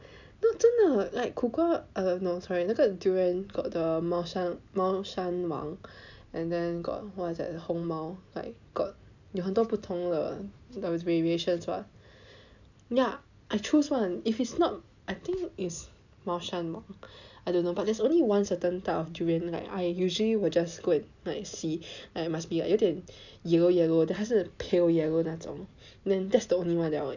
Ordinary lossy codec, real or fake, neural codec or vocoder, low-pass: none; real; none; 7.2 kHz